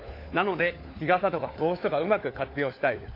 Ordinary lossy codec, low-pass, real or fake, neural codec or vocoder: AAC, 32 kbps; 5.4 kHz; fake; codec, 16 kHz, 4 kbps, FunCodec, trained on LibriTTS, 50 frames a second